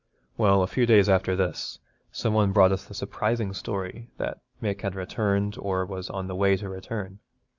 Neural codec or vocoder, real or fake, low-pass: none; real; 7.2 kHz